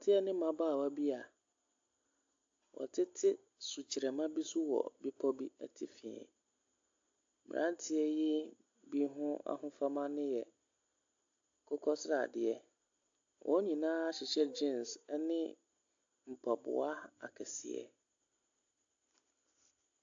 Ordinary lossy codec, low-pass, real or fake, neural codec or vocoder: AAC, 48 kbps; 7.2 kHz; real; none